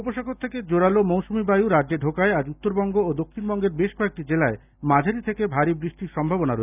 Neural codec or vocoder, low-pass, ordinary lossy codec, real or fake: none; 3.6 kHz; none; real